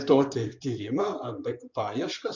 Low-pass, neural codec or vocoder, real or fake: 7.2 kHz; codec, 16 kHz in and 24 kHz out, 2.2 kbps, FireRedTTS-2 codec; fake